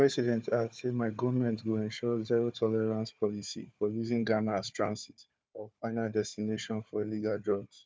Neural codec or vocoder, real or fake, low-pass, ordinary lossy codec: codec, 16 kHz, 4 kbps, FunCodec, trained on Chinese and English, 50 frames a second; fake; none; none